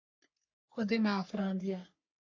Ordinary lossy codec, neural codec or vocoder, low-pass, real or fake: AAC, 48 kbps; codec, 44.1 kHz, 3.4 kbps, Pupu-Codec; 7.2 kHz; fake